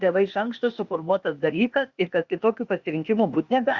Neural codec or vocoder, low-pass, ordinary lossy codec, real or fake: codec, 16 kHz, 0.8 kbps, ZipCodec; 7.2 kHz; Opus, 64 kbps; fake